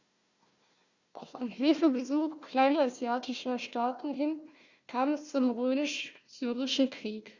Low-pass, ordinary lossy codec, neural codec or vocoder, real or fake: 7.2 kHz; Opus, 64 kbps; codec, 16 kHz, 1 kbps, FunCodec, trained on Chinese and English, 50 frames a second; fake